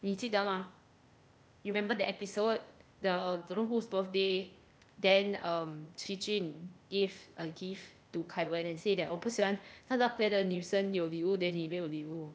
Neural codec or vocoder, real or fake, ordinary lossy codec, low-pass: codec, 16 kHz, 0.8 kbps, ZipCodec; fake; none; none